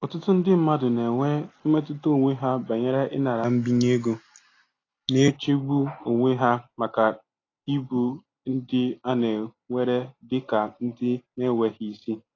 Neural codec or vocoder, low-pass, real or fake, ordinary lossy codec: none; 7.2 kHz; real; AAC, 32 kbps